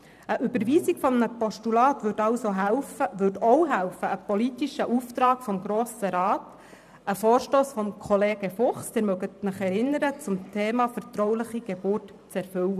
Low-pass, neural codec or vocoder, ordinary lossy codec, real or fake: 14.4 kHz; vocoder, 44.1 kHz, 128 mel bands every 512 samples, BigVGAN v2; none; fake